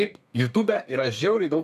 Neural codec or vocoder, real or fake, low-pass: codec, 32 kHz, 1.9 kbps, SNAC; fake; 14.4 kHz